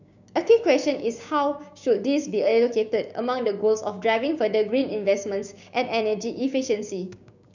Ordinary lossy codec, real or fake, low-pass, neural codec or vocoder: none; fake; 7.2 kHz; codec, 16 kHz, 6 kbps, DAC